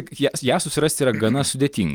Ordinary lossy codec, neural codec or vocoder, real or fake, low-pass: Opus, 32 kbps; none; real; 19.8 kHz